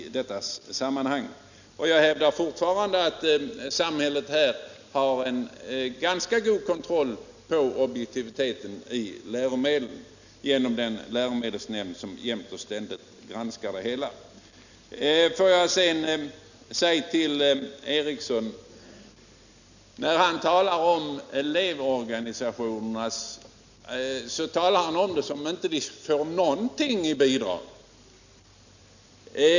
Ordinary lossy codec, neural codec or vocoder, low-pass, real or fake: none; none; 7.2 kHz; real